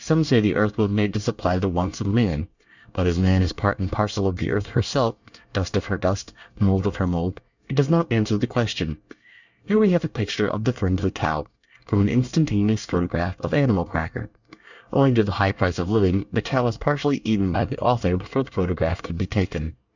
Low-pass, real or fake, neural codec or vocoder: 7.2 kHz; fake; codec, 24 kHz, 1 kbps, SNAC